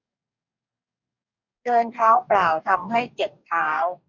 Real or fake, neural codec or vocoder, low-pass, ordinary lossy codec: fake; codec, 44.1 kHz, 2.6 kbps, DAC; 7.2 kHz; none